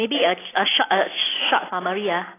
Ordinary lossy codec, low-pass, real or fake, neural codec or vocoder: AAC, 16 kbps; 3.6 kHz; fake; vocoder, 44.1 kHz, 128 mel bands every 256 samples, BigVGAN v2